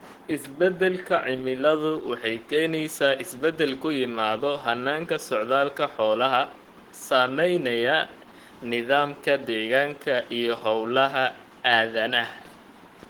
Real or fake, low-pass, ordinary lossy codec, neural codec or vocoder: fake; 19.8 kHz; Opus, 16 kbps; codec, 44.1 kHz, 7.8 kbps, Pupu-Codec